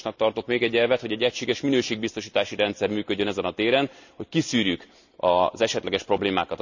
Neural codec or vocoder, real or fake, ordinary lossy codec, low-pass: none; real; none; 7.2 kHz